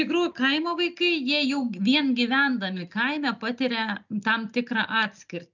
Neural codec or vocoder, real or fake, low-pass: none; real; 7.2 kHz